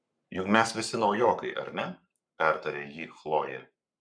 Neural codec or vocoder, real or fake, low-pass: codec, 44.1 kHz, 7.8 kbps, Pupu-Codec; fake; 9.9 kHz